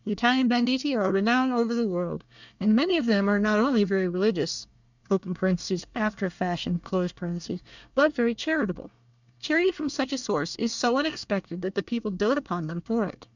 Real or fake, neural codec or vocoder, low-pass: fake; codec, 24 kHz, 1 kbps, SNAC; 7.2 kHz